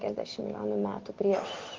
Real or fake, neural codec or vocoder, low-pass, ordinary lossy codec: real; none; 7.2 kHz; Opus, 16 kbps